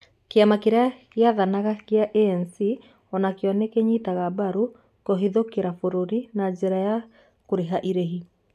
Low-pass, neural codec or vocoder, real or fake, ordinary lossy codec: 14.4 kHz; none; real; none